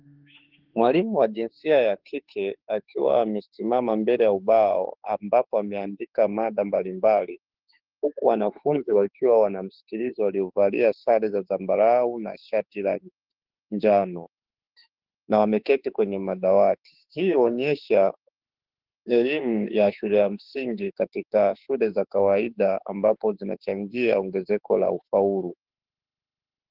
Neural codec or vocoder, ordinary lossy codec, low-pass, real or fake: autoencoder, 48 kHz, 32 numbers a frame, DAC-VAE, trained on Japanese speech; Opus, 16 kbps; 5.4 kHz; fake